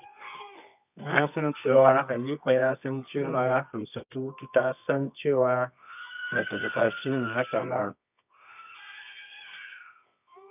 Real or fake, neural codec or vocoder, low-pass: fake; codec, 24 kHz, 0.9 kbps, WavTokenizer, medium music audio release; 3.6 kHz